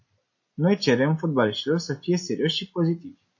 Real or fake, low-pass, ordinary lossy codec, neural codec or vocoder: real; 7.2 kHz; MP3, 32 kbps; none